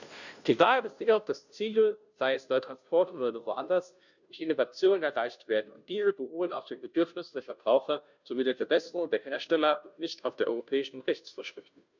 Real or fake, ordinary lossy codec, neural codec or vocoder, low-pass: fake; none; codec, 16 kHz, 0.5 kbps, FunCodec, trained on Chinese and English, 25 frames a second; 7.2 kHz